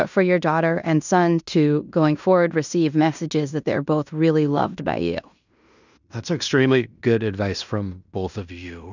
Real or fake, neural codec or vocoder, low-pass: fake; codec, 16 kHz in and 24 kHz out, 0.9 kbps, LongCat-Audio-Codec, fine tuned four codebook decoder; 7.2 kHz